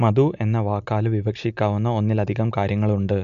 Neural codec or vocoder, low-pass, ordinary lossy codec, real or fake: none; 7.2 kHz; none; real